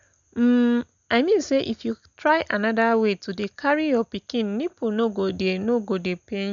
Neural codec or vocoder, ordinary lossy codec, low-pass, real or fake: none; none; 7.2 kHz; real